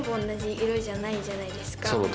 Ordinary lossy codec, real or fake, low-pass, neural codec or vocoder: none; real; none; none